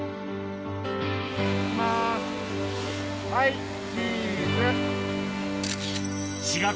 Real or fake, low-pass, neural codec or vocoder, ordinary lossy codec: real; none; none; none